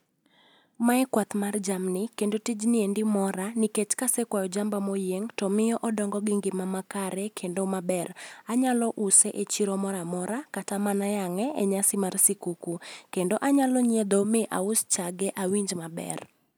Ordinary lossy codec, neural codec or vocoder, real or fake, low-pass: none; none; real; none